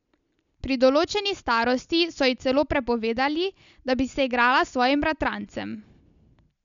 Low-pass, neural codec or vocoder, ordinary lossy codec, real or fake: 7.2 kHz; none; none; real